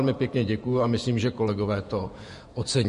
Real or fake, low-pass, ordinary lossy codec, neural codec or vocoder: fake; 14.4 kHz; MP3, 48 kbps; vocoder, 48 kHz, 128 mel bands, Vocos